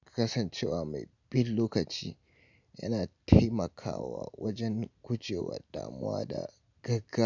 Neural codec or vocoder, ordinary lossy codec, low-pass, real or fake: none; none; 7.2 kHz; real